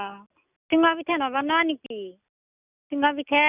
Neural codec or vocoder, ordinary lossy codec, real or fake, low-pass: none; none; real; 3.6 kHz